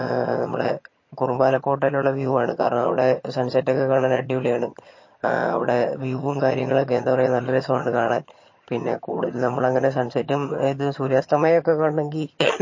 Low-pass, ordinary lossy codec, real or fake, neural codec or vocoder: 7.2 kHz; MP3, 32 kbps; fake; vocoder, 22.05 kHz, 80 mel bands, HiFi-GAN